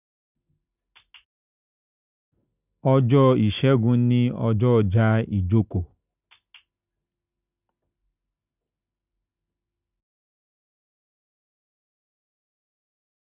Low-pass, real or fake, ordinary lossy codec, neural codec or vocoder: 3.6 kHz; real; none; none